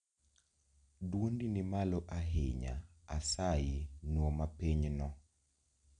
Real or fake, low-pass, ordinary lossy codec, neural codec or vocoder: real; 9.9 kHz; none; none